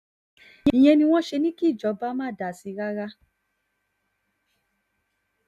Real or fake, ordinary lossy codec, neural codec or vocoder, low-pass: real; none; none; 14.4 kHz